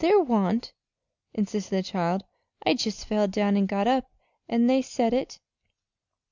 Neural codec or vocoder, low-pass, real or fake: none; 7.2 kHz; real